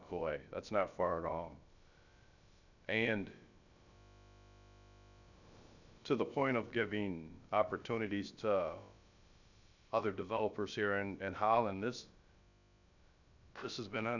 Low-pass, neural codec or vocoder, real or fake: 7.2 kHz; codec, 16 kHz, about 1 kbps, DyCAST, with the encoder's durations; fake